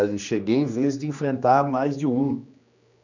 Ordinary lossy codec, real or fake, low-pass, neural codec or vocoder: none; fake; 7.2 kHz; codec, 16 kHz, 2 kbps, X-Codec, HuBERT features, trained on general audio